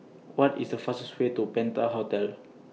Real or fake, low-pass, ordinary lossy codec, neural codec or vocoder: real; none; none; none